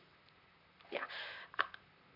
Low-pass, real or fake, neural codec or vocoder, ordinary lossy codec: 5.4 kHz; fake; vocoder, 44.1 kHz, 128 mel bands, Pupu-Vocoder; none